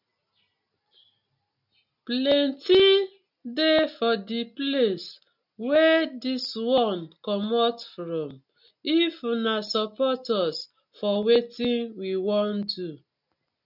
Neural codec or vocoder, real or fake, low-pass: none; real; 5.4 kHz